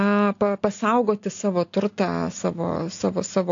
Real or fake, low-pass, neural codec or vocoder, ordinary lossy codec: real; 7.2 kHz; none; AAC, 48 kbps